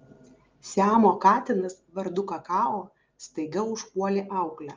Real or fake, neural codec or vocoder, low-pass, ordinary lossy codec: real; none; 7.2 kHz; Opus, 24 kbps